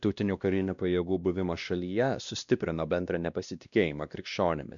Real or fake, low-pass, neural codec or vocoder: fake; 7.2 kHz; codec, 16 kHz, 1 kbps, X-Codec, WavLM features, trained on Multilingual LibriSpeech